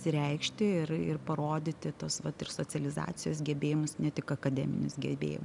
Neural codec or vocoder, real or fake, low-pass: none; real; 10.8 kHz